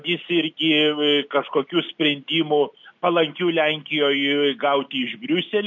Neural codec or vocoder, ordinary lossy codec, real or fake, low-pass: none; MP3, 64 kbps; real; 7.2 kHz